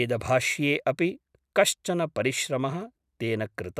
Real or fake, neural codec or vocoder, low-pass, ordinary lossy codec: real; none; 14.4 kHz; none